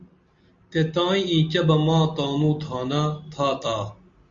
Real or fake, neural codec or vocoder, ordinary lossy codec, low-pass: real; none; Opus, 64 kbps; 7.2 kHz